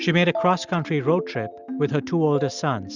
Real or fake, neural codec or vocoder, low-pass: real; none; 7.2 kHz